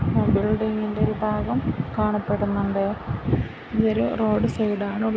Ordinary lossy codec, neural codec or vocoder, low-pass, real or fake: none; none; none; real